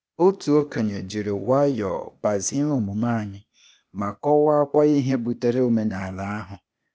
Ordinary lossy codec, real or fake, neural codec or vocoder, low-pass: none; fake; codec, 16 kHz, 0.8 kbps, ZipCodec; none